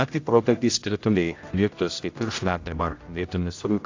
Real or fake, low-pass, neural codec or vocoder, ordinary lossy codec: fake; 7.2 kHz; codec, 16 kHz, 0.5 kbps, X-Codec, HuBERT features, trained on general audio; AAC, 48 kbps